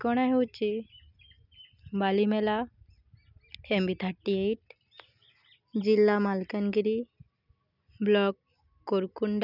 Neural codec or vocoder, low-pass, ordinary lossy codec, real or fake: none; 5.4 kHz; none; real